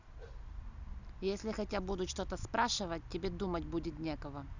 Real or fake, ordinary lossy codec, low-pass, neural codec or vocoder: real; none; 7.2 kHz; none